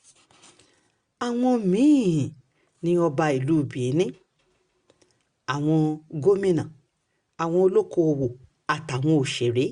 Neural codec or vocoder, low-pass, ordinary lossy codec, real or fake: none; 9.9 kHz; Opus, 64 kbps; real